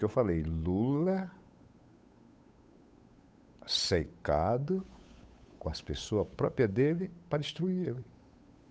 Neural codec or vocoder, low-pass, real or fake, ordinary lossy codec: codec, 16 kHz, 8 kbps, FunCodec, trained on Chinese and English, 25 frames a second; none; fake; none